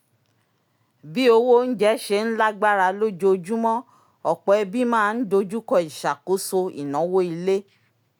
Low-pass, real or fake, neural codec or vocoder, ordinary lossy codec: none; real; none; none